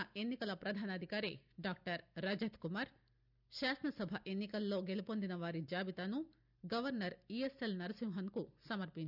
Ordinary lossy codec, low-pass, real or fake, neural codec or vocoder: none; 5.4 kHz; real; none